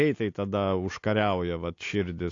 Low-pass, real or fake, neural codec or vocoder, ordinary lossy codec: 7.2 kHz; real; none; AAC, 48 kbps